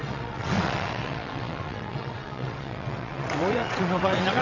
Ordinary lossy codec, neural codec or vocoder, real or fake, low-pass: none; vocoder, 22.05 kHz, 80 mel bands, WaveNeXt; fake; 7.2 kHz